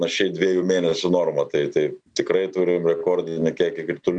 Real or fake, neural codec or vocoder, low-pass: real; none; 9.9 kHz